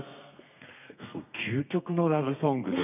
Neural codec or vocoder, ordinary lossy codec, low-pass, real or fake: codec, 32 kHz, 1.9 kbps, SNAC; none; 3.6 kHz; fake